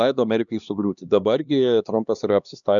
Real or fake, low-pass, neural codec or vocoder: fake; 7.2 kHz; codec, 16 kHz, 2 kbps, X-Codec, HuBERT features, trained on LibriSpeech